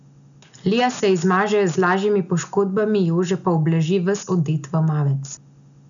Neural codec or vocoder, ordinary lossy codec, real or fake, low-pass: none; none; real; 7.2 kHz